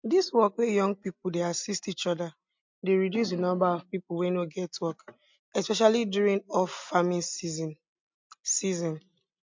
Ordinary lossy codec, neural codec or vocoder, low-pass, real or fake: MP3, 48 kbps; none; 7.2 kHz; real